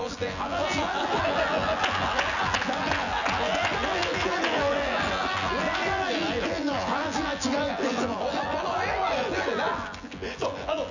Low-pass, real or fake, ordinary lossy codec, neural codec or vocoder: 7.2 kHz; fake; none; vocoder, 24 kHz, 100 mel bands, Vocos